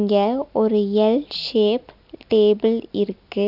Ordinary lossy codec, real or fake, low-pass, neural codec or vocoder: AAC, 48 kbps; real; 5.4 kHz; none